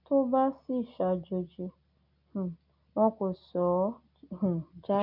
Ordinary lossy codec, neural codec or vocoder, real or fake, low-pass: none; none; real; 5.4 kHz